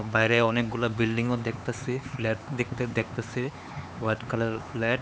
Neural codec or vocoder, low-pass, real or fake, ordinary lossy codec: codec, 16 kHz, 4 kbps, X-Codec, HuBERT features, trained on LibriSpeech; none; fake; none